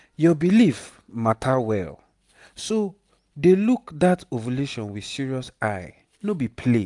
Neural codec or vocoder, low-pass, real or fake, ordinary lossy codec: none; 10.8 kHz; real; none